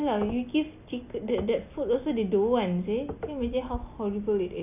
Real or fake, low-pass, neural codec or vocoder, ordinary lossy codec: real; 3.6 kHz; none; none